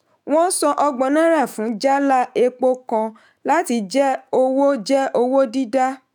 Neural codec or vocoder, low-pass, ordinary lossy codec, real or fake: autoencoder, 48 kHz, 128 numbers a frame, DAC-VAE, trained on Japanese speech; none; none; fake